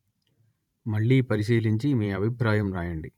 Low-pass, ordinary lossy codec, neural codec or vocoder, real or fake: 19.8 kHz; none; vocoder, 44.1 kHz, 128 mel bands every 512 samples, BigVGAN v2; fake